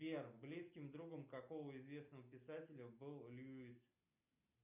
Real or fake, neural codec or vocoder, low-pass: real; none; 3.6 kHz